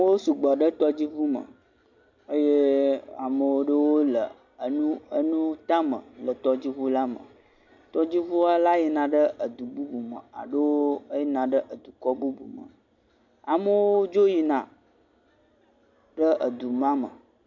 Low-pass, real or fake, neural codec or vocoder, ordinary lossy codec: 7.2 kHz; real; none; MP3, 64 kbps